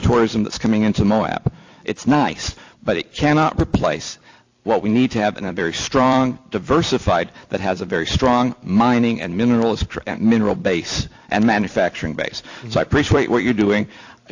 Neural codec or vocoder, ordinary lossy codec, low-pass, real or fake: vocoder, 44.1 kHz, 128 mel bands every 256 samples, BigVGAN v2; AAC, 48 kbps; 7.2 kHz; fake